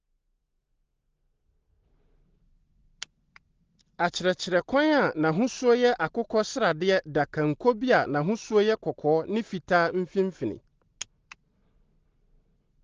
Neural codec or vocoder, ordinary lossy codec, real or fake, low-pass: none; Opus, 16 kbps; real; 7.2 kHz